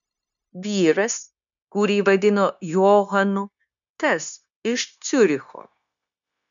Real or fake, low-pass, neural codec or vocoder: fake; 7.2 kHz; codec, 16 kHz, 0.9 kbps, LongCat-Audio-Codec